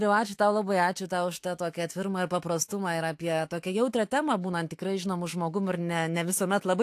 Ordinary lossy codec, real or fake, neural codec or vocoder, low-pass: AAC, 64 kbps; fake; autoencoder, 48 kHz, 128 numbers a frame, DAC-VAE, trained on Japanese speech; 14.4 kHz